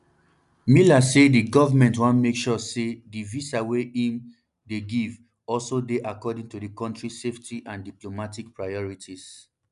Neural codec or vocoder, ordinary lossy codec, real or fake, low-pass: none; none; real; 10.8 kHz